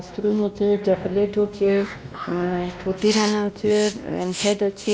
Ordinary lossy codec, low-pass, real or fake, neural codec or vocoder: none; none; fake; codec, 16 kHz, 1 kbps, X-Codec, WavLM features, trained on Multilingual LibriSpeech